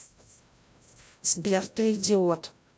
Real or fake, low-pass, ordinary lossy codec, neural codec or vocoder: fake; none; none; codec, 16 kHz, 0.5 kbps, FreqCodec, larger model